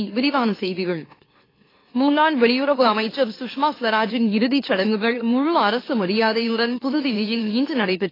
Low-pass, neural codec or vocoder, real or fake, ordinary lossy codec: 5.4 kHz; autoencoder, 44.1 kHz, a latent of 192 numbers a frame, MeloTTS; fake; AAC, 24 kbps